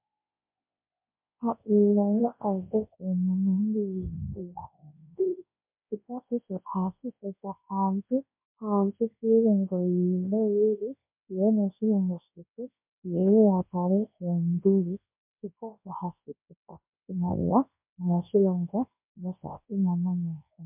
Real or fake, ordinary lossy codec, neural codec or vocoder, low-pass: fake; MP3, 32 kbps; codec, 24 kHz, 0.9 kbps, WavTokenizer, large speech release; 3.6 kHz